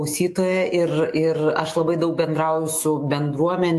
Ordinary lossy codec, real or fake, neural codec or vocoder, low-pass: AAC, 64 kbps; fake; autoencoder, 48 kHz, 128 numbers a frame, DAC-VAE, trained on Japanese speech; 14.4 kHz